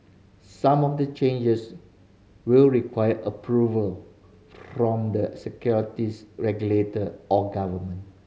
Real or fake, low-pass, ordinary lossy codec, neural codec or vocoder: real; none; none; none